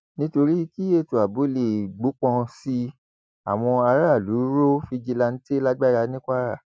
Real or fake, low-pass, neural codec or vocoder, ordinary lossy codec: real; none; none; none